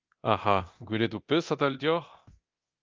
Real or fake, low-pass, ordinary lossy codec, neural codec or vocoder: fake; 7.2 kHz; Opus, 24 kbps; codec, 24 kHz, 0.9 kbps, DualCodec